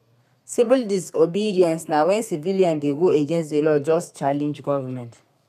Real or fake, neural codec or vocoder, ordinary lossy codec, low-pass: fake; codec, 32 kHz, 1.9 kbps, SNAC; none; 14.4 kHz